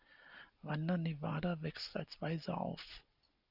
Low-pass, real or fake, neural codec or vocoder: 5.4 kHz; real; none